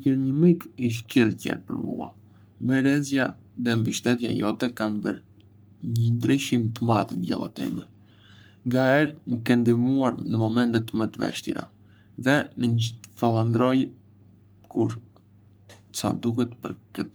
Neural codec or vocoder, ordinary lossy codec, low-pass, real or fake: codec, 44.1 kHz, 3.4 kbps, Pupu-Codec; none; none; fake